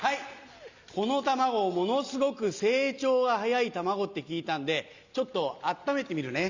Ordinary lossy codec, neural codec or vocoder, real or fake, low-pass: Opus, 64 kbps; none; real; 7.2 kHz